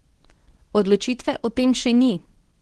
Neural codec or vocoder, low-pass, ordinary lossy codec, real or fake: codec, 24 kHz, 0.9 kbps, WavTokenizer, medium speech release version 1; 10.8 kHz; Opus, 16 kbps; fake